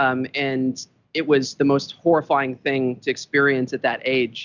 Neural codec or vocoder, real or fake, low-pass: none; real; 7.2 kHz